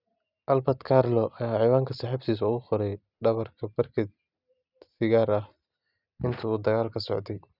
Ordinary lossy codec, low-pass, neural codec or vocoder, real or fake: none; 5.4 kHz; none; real